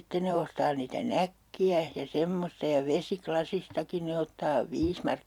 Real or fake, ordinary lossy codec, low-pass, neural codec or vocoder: fake; none; 19.8 kHz; vocoder, 44.1 kHz, 128 mel bands every 512 samples, BigVGAN v2